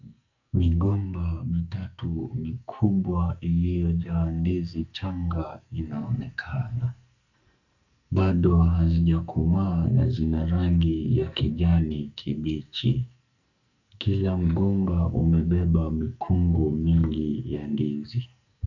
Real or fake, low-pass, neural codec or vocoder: fake; 7.2 kHz; codec, 44.1 kHz, 2.6 kbps, SNAC